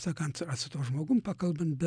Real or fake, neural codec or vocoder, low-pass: real; none; 9.9 kHz